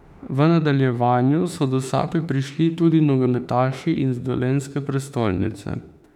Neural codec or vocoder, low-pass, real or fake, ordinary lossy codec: autoencoder, 48 kHz, 32 numbers a frame, DAC-VAE, trained on Japanese speech; 19.8 kHz; fake; none